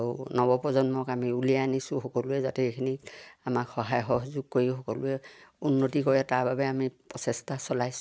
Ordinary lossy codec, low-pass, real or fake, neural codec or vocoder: none; none; real; none